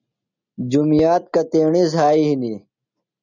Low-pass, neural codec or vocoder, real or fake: 7.2 kHz; none; real